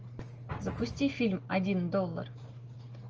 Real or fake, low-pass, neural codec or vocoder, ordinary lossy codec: real; 7.2 kHz; none; Opus, 24 kbps